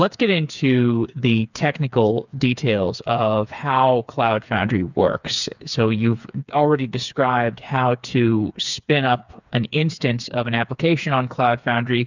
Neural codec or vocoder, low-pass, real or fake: codec, 16 kHz, 4 kbps, FreqCodec, smaller model; 7.2 kHz; fake